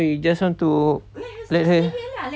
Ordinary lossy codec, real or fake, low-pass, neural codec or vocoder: none; real; none; none